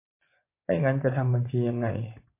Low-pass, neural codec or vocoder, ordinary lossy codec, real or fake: 3.6 kHz; vocoder, 24 kHz, 100 mel bands, Vocos; MP3, 24 kbps; fake